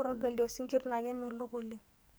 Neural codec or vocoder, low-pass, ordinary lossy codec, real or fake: codec, 44.1 kHz, 2.6 kbps, SNAC; none; none; fake